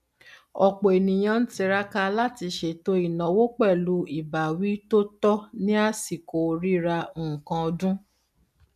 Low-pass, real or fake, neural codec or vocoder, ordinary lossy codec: 14.4 kHz; real; none; none